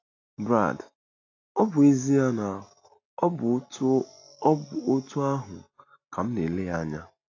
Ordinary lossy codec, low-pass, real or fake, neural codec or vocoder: AAC, 48 kbps; 7.2 kHz; real; none